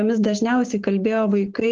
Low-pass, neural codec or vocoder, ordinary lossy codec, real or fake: 7.2 kHz; codec, 16 kHz, 6 kbps, DAC; Opus, 16 kbps; fake